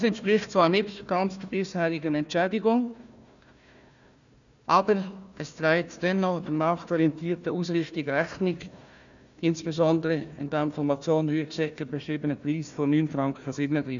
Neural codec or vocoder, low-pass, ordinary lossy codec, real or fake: codec, 16 kHz, 1 kbps, FunCodec, trained on Chinese and English, 50 frames a second; 7.2 kHz; none; fake